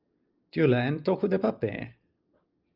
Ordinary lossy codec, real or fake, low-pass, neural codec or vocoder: Opus, 24 kbps; real; 5.4 kHz; none